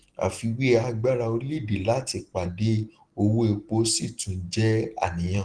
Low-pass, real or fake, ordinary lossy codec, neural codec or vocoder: 9.9 kHz; real; Opus, 16 kbps; none